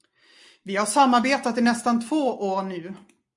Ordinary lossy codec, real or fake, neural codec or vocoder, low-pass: MP3, 64 kbps; real; none; 10.8 kHz